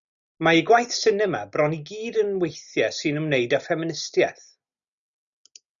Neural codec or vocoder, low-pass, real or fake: none; 7.2 kHz; real